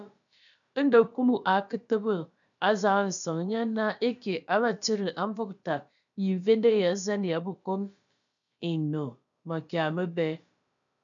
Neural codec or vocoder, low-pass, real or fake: codec, 16 kHz, about 1 kbps, DyCAST, with the encoder's durations; 7.2 kHz; fake